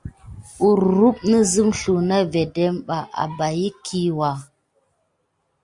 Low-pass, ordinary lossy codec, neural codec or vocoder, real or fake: 10.8 kHz; Opus, 64 kbps; none; real